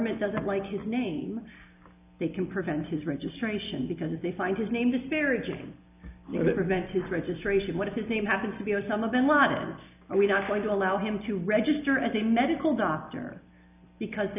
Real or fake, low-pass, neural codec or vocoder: real; 3.6 kHz; none